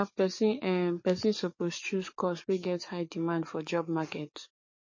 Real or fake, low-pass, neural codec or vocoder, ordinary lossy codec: fake; 7.2 kHz; codec, 44.1 kHz, 7.8 kbps, DAC; MP3, 32 kbps